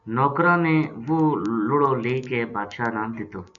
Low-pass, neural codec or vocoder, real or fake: 7.2 kHz; none; real